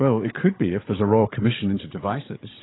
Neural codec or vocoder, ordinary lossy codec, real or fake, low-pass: codec, 16 kHz, 16 kbps, FunCodec, trained on Chinese and English, 50 frames a second; AAC, 16 kbps; fake; 7.2 kHz